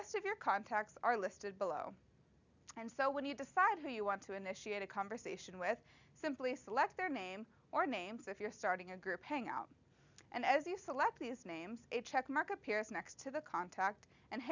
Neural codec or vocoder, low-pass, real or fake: none; 7.2 kHz; real